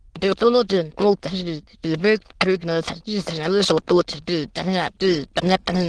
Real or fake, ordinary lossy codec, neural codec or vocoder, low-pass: fake; Opus, 16 kbps; autoencoder, 22.05 kHz, a latent of 192 numbers a frame, VITS, trained on many speakers; 9.9 kHz